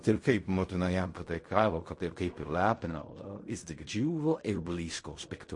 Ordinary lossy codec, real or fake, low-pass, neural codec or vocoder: MP3, 48 kbps; fake; 10.8 kHz; codec, 16 kHz in and 24 kHz out, 0.4 kbps, LongCat-Audio-Codec, fine tuned four codebook decoder